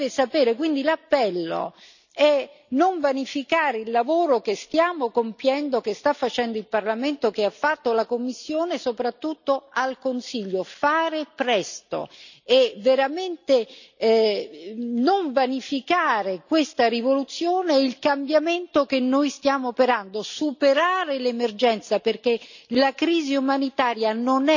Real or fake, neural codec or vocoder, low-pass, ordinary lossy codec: real; none; 7.2 kHz; none